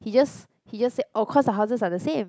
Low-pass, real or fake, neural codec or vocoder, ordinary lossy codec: none; real; none; none